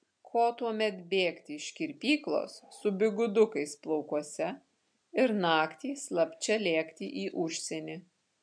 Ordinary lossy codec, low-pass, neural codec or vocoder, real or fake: MP3, 64 kbps; 9.9 kHz; none; real